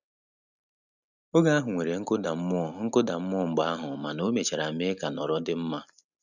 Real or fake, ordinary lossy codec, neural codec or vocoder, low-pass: real; none; none; 7.2 kHz